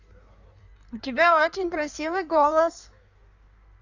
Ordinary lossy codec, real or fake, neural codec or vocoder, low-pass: none; fake; codec, 16 kHz in and 24 kHz out, 1.1 kbps, FireRedTTS-2 codec; 7.2 kHz